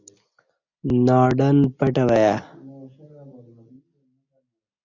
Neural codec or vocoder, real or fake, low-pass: none; real; 7.2 kHz